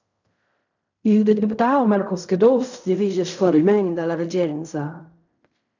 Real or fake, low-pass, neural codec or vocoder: fake; 7.2 kHz; codec, 16 kHz in and 24 kHz out, 0.4 kbps, LongCat-Audio-Codec, fine tuned four codebook decoder